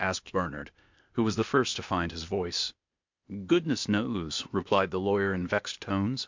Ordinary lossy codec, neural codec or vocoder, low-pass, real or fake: MP3, 64 kbps; codec, 16 kHz, 0.8 kbps, ZipCodec; 7.2 kHz; fake